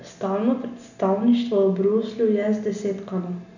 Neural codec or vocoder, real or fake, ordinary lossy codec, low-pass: none; real; none; 7.2 kHz